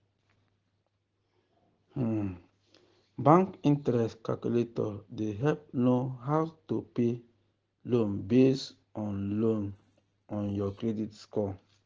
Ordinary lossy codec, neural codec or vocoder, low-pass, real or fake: Opus, 16 kbps; none; 7.2 kHz; real